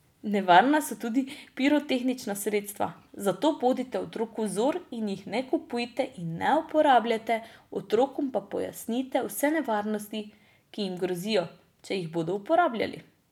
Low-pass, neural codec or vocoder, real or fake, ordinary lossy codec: 19.8 kHz; none; real; none